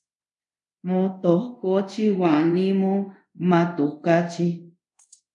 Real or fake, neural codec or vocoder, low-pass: fake; codec, 24 kHz, 0.5 kbps, DualCodec; 10.8 kHz